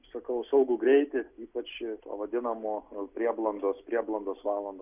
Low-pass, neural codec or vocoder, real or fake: 3.6 kHz; none; real